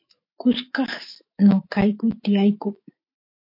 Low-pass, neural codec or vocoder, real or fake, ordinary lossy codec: 5.4 kHz; autoencoder, 48 kHz, 128 numbers a frame, DAC-VAE, trained on Japanese speech; fake; MP3, 32 kbps